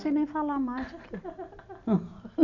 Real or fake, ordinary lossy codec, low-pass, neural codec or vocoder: real; none; 7.2 kHz; none